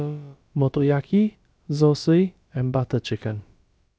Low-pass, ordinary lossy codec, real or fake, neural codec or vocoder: none; none; fake; codec, 16 kHz, about 1 kbps, DyCAST, with the encoder's durations